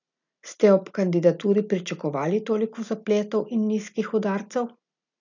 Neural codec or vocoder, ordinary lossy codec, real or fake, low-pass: none; none; real; 7.2 kHz